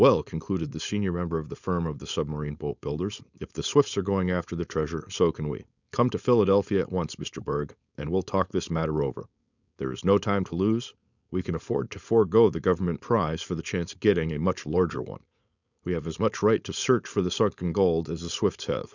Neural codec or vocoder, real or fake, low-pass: codec, 16 kHz, 4.8 kbps, FACodec; fake; 7.2 kHz